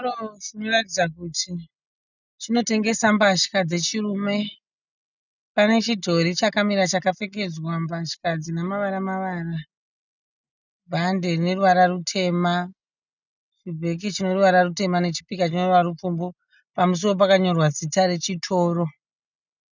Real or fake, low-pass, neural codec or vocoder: real; 7.2 kHz; none